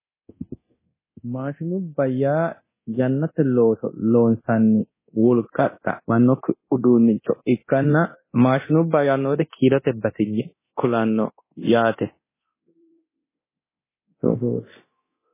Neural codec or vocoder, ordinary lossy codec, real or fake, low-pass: codec, 24 kHz, 0.9 kbps, DualCodec; MP3, 16 kbps; fake; 3.6 kHz